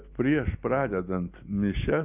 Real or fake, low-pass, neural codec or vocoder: real; 3.6 kHz; none